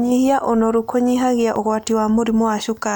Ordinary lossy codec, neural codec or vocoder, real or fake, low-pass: none; none; real; none